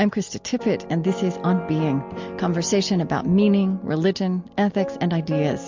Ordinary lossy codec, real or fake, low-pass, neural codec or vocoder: MP3, 64 kbps; real; 7.2 kHz; none